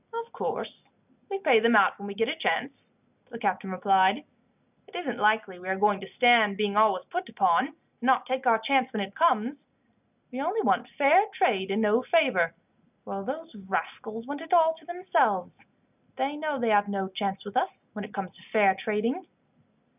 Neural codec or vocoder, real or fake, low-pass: none; real; 3.6 kHz